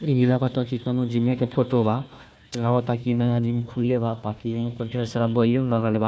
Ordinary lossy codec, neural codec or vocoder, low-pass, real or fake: none; codec, 16 kHz, 1 kbps, FunCodec, trained on Chinese and English, 50 frames a second; none; fake